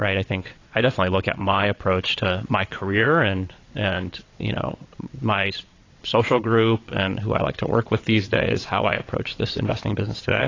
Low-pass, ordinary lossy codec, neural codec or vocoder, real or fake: 7.2 kHz; AAC, 32 kbps; vocoder, 22.05 kHz, 80 mel bands, WaveNeXt; fake